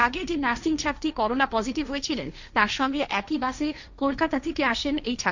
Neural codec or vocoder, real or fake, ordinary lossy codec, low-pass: codec, 16 kHz, 1.1 kbps, Voila-Tokenizer; fake; none; 7.2 kHz